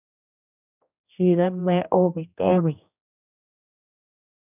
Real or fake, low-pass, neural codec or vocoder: fake; 3.6 kHz; codec, 16 kHz, 1 kbps, X-Codec, HuBERT features, trained on general audio